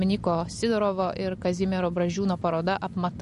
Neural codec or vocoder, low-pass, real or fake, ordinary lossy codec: none; 14.4 kHz; real; MP3, 48 kbps